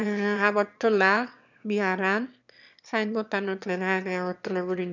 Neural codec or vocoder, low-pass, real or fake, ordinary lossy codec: autoencoder, 22.05 kHz, a latent of 192 numbers a frame, VITS, trained on one speaker; 7.2 kHz; fake; none